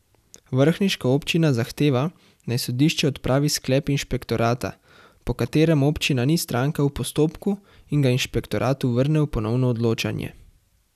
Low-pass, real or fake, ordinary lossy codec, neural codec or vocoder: 14.4 kHz; real; none; none